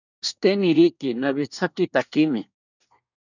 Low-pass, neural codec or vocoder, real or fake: 7.2 kHz; codec, 24 kHz, 1 kbps, SNAC; fake